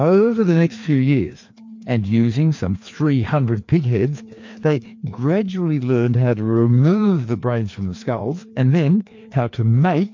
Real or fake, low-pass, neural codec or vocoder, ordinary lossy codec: fake; 7.2 kHz; codec, 16 kHz, 2 kbps, FreqCodec, larger model; MP3, 48 kbps